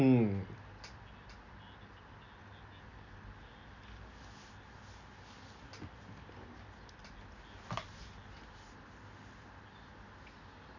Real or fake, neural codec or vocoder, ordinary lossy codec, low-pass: real; none; none; 7.2 kHz